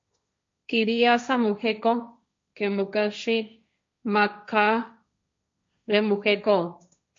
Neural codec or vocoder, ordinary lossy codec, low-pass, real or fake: codec, 16 kHz, 1.1 kbps, Voila-Tokenizer; MP3, 48 kbps; 7.2 kHz; fake